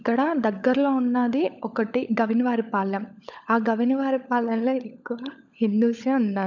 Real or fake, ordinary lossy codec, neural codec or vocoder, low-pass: fake; none; codec, 16 kHz, 16 kbps, FunCodec, trained on LibriTTS, 50 frames a second; 7.2 kHz